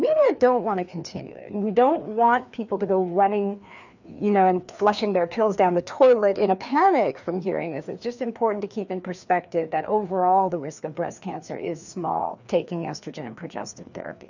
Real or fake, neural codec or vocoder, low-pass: fake; codec, 16 kHz, 2 kbps, FreqCodec, larger model; 7.2 kHz